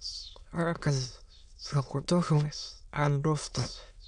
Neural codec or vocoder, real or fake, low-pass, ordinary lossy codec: autoencoder, 22.05 kHz, a latent of 192 numbers a frame, VITS, trained on many speakers; fake; 9.9 kHz; none